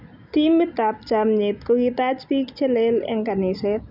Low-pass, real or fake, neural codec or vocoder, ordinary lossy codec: 5.4 kHz; real; none; none